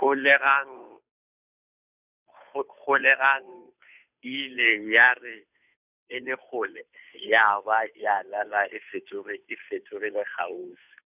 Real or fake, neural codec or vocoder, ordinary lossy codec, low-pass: fake; codec, 16 kHz, 2 kbps, FunCodec, trained on Chinese and English, 25 frames a second; none; 3.6 kHz